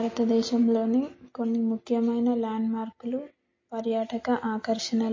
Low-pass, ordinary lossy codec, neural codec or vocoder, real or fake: 7.2 kHz; MP3, 32 kbps; none; real